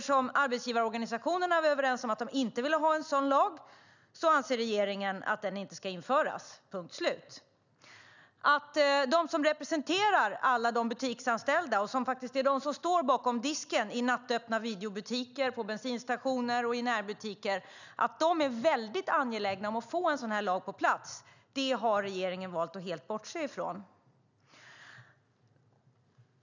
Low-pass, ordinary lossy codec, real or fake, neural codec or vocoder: 7.2 kHz; none; real; none